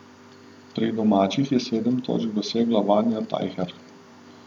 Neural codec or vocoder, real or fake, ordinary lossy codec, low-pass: vocoder, 44.1 kHz, 128 mel bands every 512 samples, BigVGAN v2; fake; none; 19.8 kHz